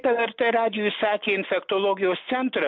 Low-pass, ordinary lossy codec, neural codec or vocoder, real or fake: 7.2 kHz; MP3, 48 kbps; none; real